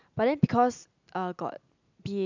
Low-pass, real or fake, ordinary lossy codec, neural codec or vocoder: 7.2 kHz; real; none; none